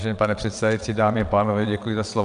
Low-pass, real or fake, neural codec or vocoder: 9.9 kHz; fake; vocoder, 22.05 kHz, 80 mel bands, WaveNeXt